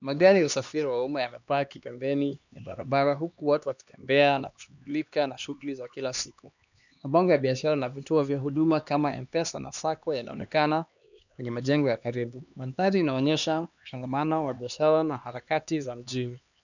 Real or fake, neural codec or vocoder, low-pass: fake; codec, 16 kHz, 2 kbps, X-Codec, HuBERT features, trained on LibriSpeech; 7.2 kHz